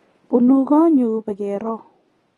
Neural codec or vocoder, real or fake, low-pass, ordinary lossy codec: none; real; 19.8 kHz; AAC, 32 kbps